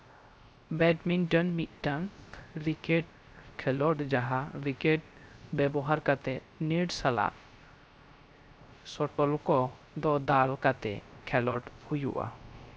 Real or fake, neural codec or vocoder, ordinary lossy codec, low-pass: fake; codec, 16 kHz, 0.3 kbps, FocalCodec; none; none